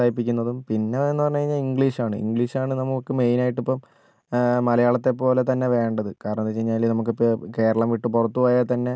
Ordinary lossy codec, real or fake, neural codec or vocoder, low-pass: none; real; none; none